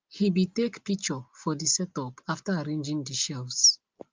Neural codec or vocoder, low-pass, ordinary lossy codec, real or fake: none; 7.2 kHz; Opus, 24 kbps; real